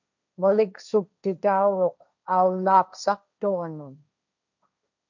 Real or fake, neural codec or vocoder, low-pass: fake; codec, 16 kHz, 1.1 kbps, Voila-Tokenizer; 7.2 kHz